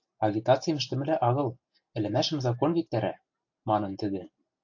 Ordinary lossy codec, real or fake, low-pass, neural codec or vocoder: AAC, 48 kbps; real; 7.2 kHz; none